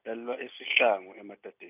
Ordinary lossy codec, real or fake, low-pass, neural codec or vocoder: none; real; 3.6 kHz; none